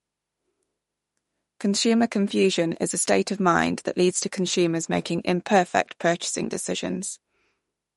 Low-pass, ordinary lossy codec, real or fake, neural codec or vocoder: 19.8 kHz; MP3, 48 kbps; fake; autoencoder, 48 kHz, 32 numbers a frame, DAC-VAE, trained on Japanese speech